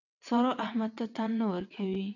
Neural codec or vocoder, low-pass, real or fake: vocoder, 22.05 kHz, 80 mel bands, WaveNeXt; 7.2 kHz; fake